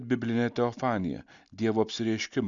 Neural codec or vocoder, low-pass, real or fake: none; 7.2 kHz; real